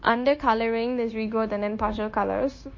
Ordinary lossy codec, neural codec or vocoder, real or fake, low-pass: MP3, 32 kbps; codec, 16 kHz, 0.9 kbps, LongCat-Audio-Codec; fake; 7.2 kHz